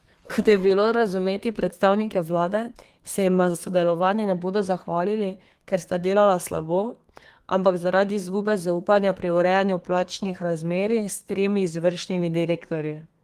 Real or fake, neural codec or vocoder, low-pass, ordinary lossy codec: fake; codec, 32 kHz, 1.9 kbps, SNAC; 14.4 kHz; Opus, 24 kbps